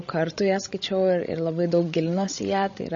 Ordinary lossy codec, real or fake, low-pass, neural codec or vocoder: MP3, 32 kbps; fake; 7.2 kHz; codec, 16 kHz, 16 kbps, FreqCodec, larger model